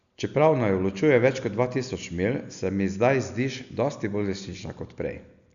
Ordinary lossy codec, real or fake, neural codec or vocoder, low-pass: none; real; none; 7.2 kHz